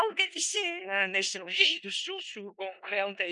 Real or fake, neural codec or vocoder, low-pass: fake; codec, 16 kHz in and 24 kHz out, 0.4 kbps, LongCat-Audio-Codec, four codebook decoder; 10.8 kHz